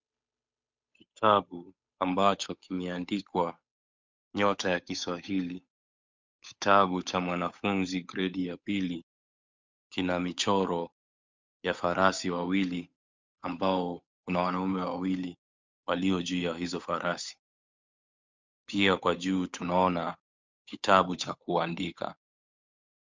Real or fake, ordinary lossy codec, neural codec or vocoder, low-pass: fake; MP3, 64 kbps; codec, 16 kHz, 8 kbps, FunCodec, trained on Chinese and English, 25 frames a second; 7.2 kHz